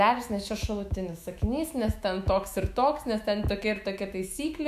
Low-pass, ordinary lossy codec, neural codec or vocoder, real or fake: 14.4 kHz; AAC, 96 kbps; autoencoder, 48 kHz, 128 numbers a frame, DAC-VAE, trained on Japanese speech; fake